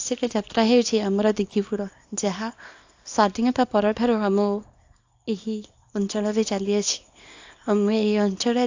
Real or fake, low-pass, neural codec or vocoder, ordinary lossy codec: fake; 7.2 kHz; codec, 24 kHz, 0.9 kbps, WavTokenizer, small release; AAC, 48 kbps